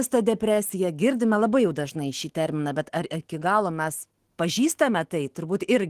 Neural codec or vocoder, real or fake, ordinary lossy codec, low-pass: autoencoder, 48 kHz, 128 numbers a frame, DAC-VAE, trained on Japanese speech; fake; Opus, 16 kbps; 14.4 kHz